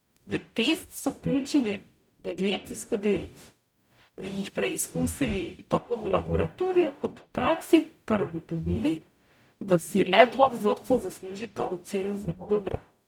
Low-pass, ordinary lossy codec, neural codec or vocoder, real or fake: 19.8 kHz; none; codec, 44.1 kHz, 0.9 kbps, DAC; fake